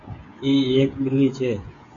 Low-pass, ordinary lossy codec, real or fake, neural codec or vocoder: 7.2 kHz; AAC, 48 kbps; fake; codec, 16 kHz, 16 kbps, FreqCodec, smaller model